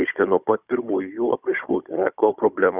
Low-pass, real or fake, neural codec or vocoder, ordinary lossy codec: 3.6 kHz; fake; codec, 16 kHz, 16 kbps, FunCodec, trained on Chinese and English, 50 frames a second; Opus, 64 kbps